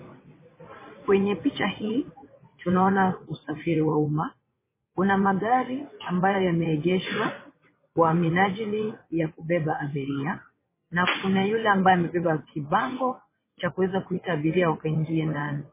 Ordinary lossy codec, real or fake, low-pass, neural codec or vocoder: MP3, 16 kbps; fake; 3.6 kHz; vocoder, 22.05 kHz, 80 mel bands, WaveNeXt